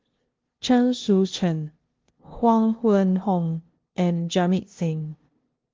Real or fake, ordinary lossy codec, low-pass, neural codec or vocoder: fake; Opus, 16 kbps; 7.2 kHz; codec, 16 kHz, 0.5 kbps, FunCodec, trained on LibriTTS, 25 frames a second